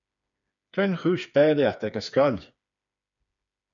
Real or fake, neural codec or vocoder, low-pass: fake; codec, 16 kHz, 4 kbps, FreqCodec, smaller model; 7.2 kHz